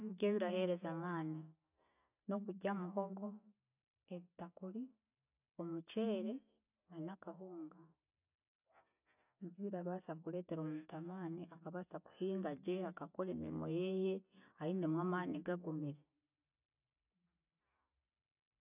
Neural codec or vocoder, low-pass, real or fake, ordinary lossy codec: none; 3.6 kHz; real; none